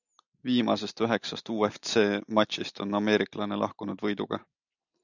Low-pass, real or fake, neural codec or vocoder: 7.2 kHz; real; none